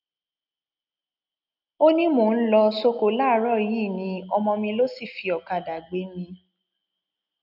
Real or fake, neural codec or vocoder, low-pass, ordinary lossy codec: real; none; 5.4 kHz; none